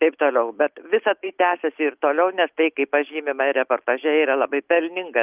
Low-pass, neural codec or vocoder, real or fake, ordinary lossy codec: 3.6 kHz; none; real; Opus, 24 kbps